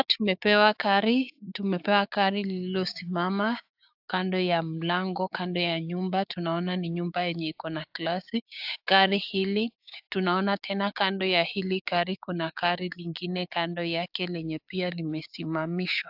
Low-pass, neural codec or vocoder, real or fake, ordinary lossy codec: 5.4 kHz; codec, 16 kHz, 6 kbps, DAC; fake; AAC, 48 kbps